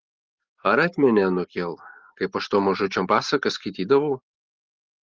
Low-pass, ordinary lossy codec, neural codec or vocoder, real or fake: 7.2 kHz; Opus, 16 kbps; none; real